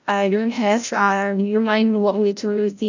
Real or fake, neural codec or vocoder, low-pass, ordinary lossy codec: fake; codec, 16 kHz, 0.5 kbps, FreqCodec, larger model; 7.2 kHz; none